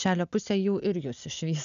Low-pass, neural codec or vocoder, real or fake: 7.2 kHz; none; real